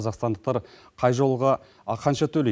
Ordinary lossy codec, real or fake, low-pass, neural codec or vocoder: none; real; none; none